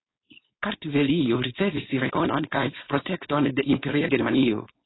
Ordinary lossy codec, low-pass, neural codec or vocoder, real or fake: AAC, 16 kbps; 7.2 kHz; codec, 16 kHz, 4.8 kbps, FACodec; fake